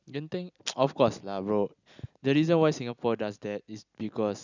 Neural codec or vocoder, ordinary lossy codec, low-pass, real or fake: none; none; 7.2 kHz; real